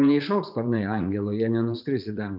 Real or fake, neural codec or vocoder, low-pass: fake; vocoder, 44.1 kHz, 80 mel bands, Vocos; 5.4 kHz